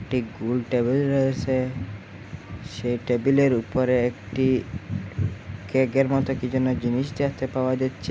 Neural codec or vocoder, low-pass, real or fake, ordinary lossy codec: none; none; real; none